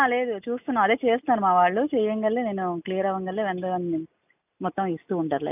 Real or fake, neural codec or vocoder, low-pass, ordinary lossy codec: real; none; 3.6 kHz; none